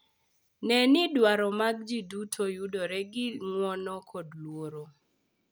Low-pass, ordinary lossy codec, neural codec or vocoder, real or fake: none; none; none; real